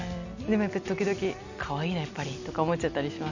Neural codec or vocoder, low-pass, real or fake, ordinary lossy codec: none; 7.2 kHz; real; none